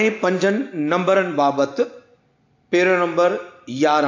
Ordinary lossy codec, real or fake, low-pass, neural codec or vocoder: AAC, 48 kbps; real; 7.2 kHz; none